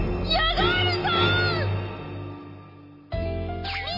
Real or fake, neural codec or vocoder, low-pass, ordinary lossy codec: real; none; 5.4 kHz; MP3, 24 kbps